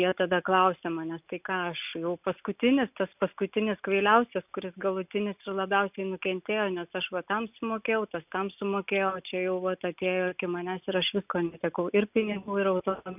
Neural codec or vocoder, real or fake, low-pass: none; real; 3.6 kHz